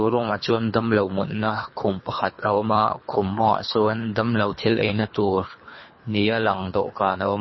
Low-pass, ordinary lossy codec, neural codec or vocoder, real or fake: 7.2 kHz; MP3, 24 kbps; codec, 24 kHz, 3 kbps, HILCodec; fake